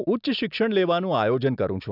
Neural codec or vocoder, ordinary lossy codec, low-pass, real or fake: none; none; 5.4 kHz; real